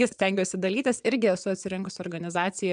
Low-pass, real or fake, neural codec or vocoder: 9.9 kHz; fake; vocoder, 22.05 kHz, 80 mel bands, WaveNeXt